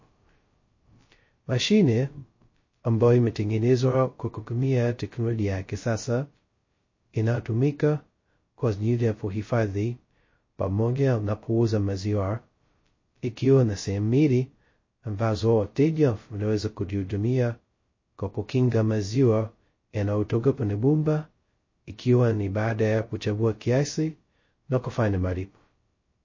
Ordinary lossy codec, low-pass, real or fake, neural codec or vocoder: MP3, 32 kbps; 7.2 kHz; fake; codec, 16 kHz, 0.2 kbps, FocalCodec